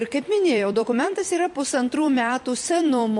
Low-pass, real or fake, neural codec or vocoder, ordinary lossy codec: 10.8 kHz; fake; vocoder, 44.1 kHz, 128 mel bands every 256 samples, BigVGAN v2; MP3, 48 kbps